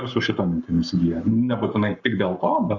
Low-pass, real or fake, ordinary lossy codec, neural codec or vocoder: 7.2 kHz; fake; Opus, 64 kbps; codec, 44.1 kHz, 7.8 kbps, Pupu-Codec